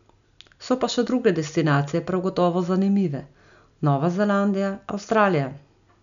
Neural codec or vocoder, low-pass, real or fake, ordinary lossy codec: none; 7.2 kHz; real; none